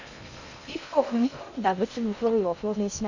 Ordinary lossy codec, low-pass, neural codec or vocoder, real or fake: none; 7.2 kHz; codec, 16 kHz in and 24 kHz out, 0.6 kbps, FocalCodec, streaming, 2048 codes; fake